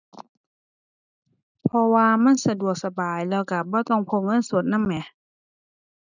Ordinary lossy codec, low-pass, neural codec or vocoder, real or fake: none; 7.2 kHz; none; real